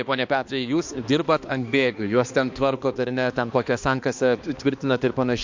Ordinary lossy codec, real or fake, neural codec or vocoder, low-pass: MP3, 48 kbps; fake; codec, 16 kHz, 2 kbps, X-Codec, HuBERT features, trained on balanced general audio; 7.2 kHz